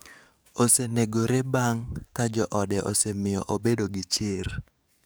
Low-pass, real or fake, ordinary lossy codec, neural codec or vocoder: none; fake; none; codec, 44.1 kHz, 7.8 kbps, DAC